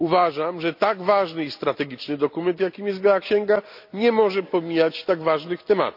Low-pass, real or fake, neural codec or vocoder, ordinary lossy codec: 5.4 kHz; real; none; none